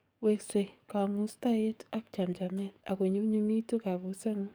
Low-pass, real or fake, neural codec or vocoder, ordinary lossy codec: none; fake; codec, 44.1 kHz, 7.8 kbps, DAC; none